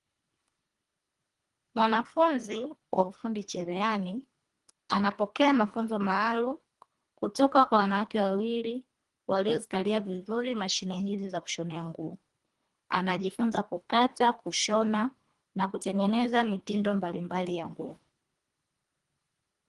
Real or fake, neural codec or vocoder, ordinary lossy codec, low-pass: fake; codec, 24 kHz, 1.5 kbps, HILCodec; Opus, 24 kbps; 10.8 kHz